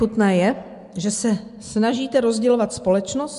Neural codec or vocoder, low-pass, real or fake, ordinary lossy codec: none; 10.8 kHz; real; MP3, 64 kbps